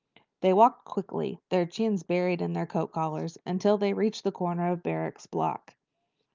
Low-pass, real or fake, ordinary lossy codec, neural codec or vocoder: 7.2 kHz; real; Opus, 24 kbps; none